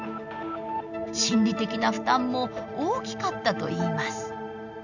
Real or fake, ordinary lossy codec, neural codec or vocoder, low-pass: real; none; none; 7.2 kHz